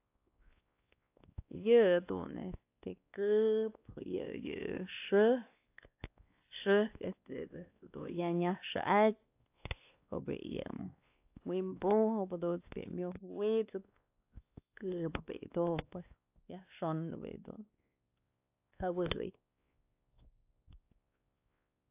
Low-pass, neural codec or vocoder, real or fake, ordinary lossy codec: 3.6 kHz; codec, 16 kHz, 2 kbps, X-Codec, WavLM features, trained on Multilingual LibriSpeech; fake; AAC, 32 kbps